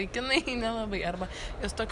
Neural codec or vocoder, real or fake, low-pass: none; real; 10.8 kHz